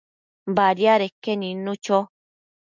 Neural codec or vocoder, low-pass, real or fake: none; 7.2 kHz; real